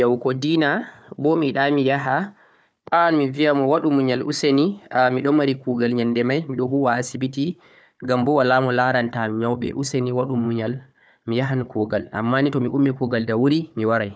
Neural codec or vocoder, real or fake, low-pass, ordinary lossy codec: codec, 16 kHz, 4 kbps, FunCodec, trained on Chinese and English, 50 frames a second; fake; none; none